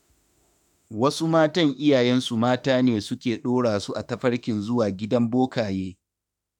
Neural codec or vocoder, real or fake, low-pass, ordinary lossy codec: autoencoder, 48 kHz, 32 numbers a frame, DAC-VAE, trained on Japanese speech; fake; 19.8 kHz; none